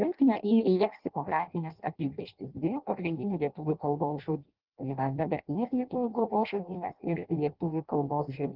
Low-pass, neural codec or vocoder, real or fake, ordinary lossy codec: 5.4 kHz; codec, 16 kHz in and 24 kHz out, 0.6 kbps, FireRedTTS-2 codec; fake; Opus, 16 kbps